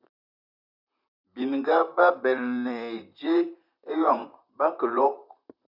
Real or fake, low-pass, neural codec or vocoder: fake; 5.4 kHz; vocoder, 44.1 kHz, 128 mel bands, Pupu-Vocoder